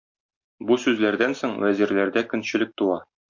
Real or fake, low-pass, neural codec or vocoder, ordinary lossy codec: real; 7.2 kHz; none; MP3, 48 kbps